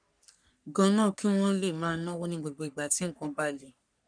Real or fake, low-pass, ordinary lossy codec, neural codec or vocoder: fake; 9.9 kHz; none; codec, 44.1 kHz, 3.4 kbps, Pupu-Codec